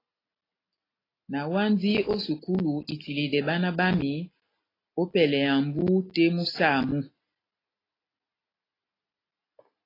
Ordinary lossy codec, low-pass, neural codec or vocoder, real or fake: AAC, 24 kbps; 5.4 kHz; none; real